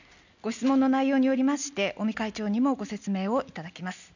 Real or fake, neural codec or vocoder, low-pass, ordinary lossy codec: real; none; 7.2 kHz; none